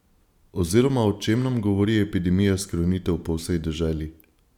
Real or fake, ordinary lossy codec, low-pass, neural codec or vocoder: fake; none; 19.8 kHz; vocoder, 44.1 kHz, 128 mel bands every 512 samples, BigVGAN v2